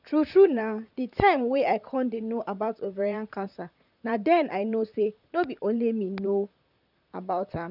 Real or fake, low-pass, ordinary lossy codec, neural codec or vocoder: fake; 5.4 kHz; none; vocoder, 44.1 kHz, 128 mel bands, Pupu-Vocoder